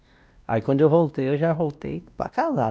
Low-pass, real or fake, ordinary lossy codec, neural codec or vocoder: none; fake; none; codec, 16 kHz, 1 kbps, X-Codec, WavLM features, trained on Multilingual LibriSpeech